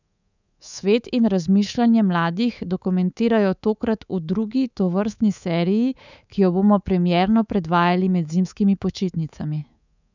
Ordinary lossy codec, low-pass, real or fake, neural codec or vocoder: none; 7.2 kHz; fake; codec, 24 kHz, 3.1 kbps, DualCodec